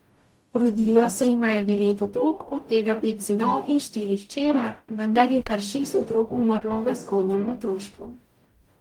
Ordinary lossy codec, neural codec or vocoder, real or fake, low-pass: Opus, 32 kbps; codec, 44.1 kHz, 0.9 kbps, DAC; fake; 19.8 kHz